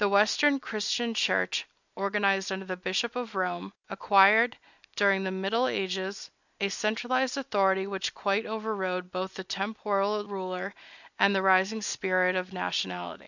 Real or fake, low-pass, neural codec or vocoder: real; 7.2 kHz; none